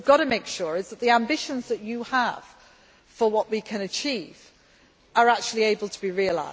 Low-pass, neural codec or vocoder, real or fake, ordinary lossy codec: none; none; real; none